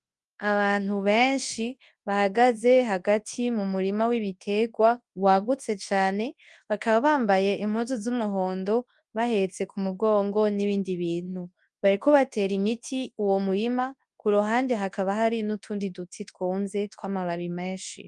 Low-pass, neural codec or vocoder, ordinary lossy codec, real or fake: 10.8 kHz; codec, 24 kHz, 0.9 kbps, WavTokenizer, large speech release; Opus, 32 kbps; fake